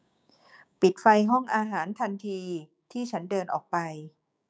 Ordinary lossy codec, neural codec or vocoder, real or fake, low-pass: none; codec, 16 kHz, 6 kbps, DAC; fake; none